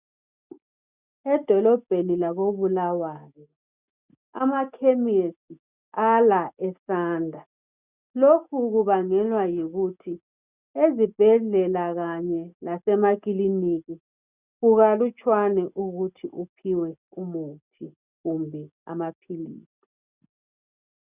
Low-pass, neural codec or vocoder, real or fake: 3.6 kHz; none; real